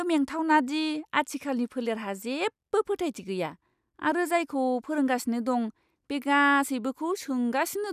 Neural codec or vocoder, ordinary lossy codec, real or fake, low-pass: none; none; real; 14.4 kHz